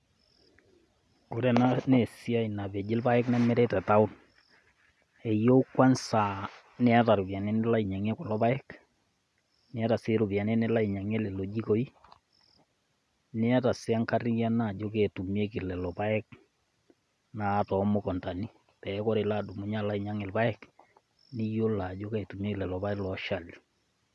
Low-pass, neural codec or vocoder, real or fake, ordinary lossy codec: none; none; real; none